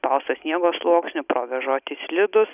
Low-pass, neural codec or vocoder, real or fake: 3.6 kHz; none; real